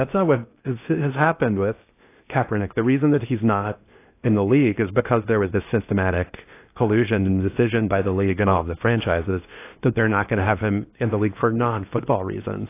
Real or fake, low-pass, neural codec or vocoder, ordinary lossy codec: fake; 3.6 kHz; codec, 16 kHz in and 24 kHz out, 0.8 kbps, FocalCodec, streaming, 65536 codes; AAC, 24 kbps